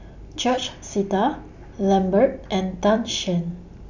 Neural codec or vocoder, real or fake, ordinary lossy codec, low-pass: none; real; none; 7.2 kHz